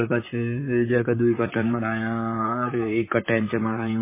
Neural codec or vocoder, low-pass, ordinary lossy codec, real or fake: vocoder, 44.1 kHz, 128 mel bands, Pupu-Vocoder; 3.6 kHz; MP3, 16 kbps; fake